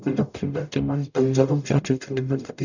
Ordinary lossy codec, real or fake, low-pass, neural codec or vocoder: none; fake; 7.2 kHz; codec, 44.1 kHz, 0.9 kbps, DAC